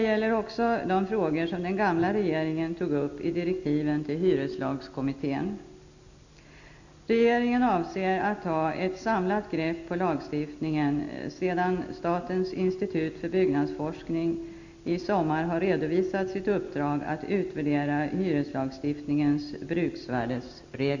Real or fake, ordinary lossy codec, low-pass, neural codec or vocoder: real; none; 7.2 kHz; none